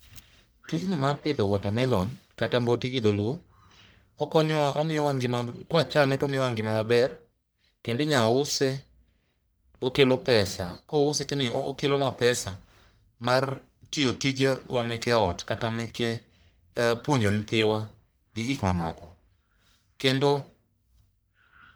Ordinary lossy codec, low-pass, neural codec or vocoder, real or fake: none; none; codec, 44.1 kHz, 1.7 kbps, Pupu-Codec; fake